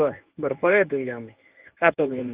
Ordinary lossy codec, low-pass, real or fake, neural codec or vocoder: Opus, 32 kbps; 3.6 kHz; fake; codec, 24 kHz, 0.9 kbps, WavTokenizer, medium speech release version 1